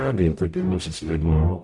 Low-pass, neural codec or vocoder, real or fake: 10.8 kHz; codec, 44.1 kHz, 0.9 kbps, DAC; fake